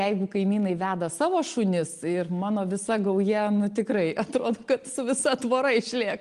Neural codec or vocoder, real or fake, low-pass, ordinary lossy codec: none; real; 10.8 kHz; Opus, 24 kbps